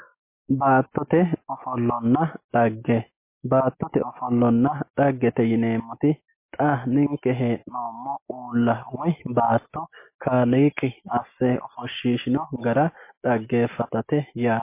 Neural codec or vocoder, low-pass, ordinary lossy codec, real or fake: none; 3.6 kHz; MP3, 24 kbps; real